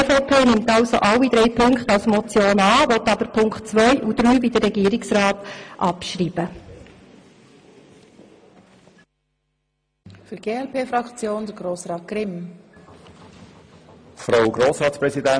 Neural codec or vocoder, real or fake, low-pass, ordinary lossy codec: none; real; 9.9 kHz; MP3, 64 kbps